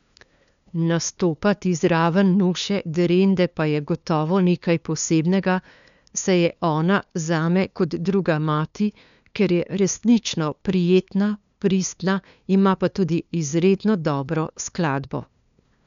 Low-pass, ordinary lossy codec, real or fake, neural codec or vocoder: 7.2 kHz; none; fake; codec, 16 kHz, 2 kbps, FunCodec, trained on LibriTTS, 25 frames a second